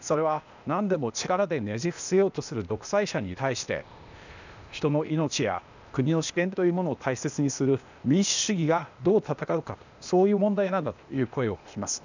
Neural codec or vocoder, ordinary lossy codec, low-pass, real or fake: codec, 16 kHz, 0.8 kbps, ZipCodec; none; 7.2 kHz; fake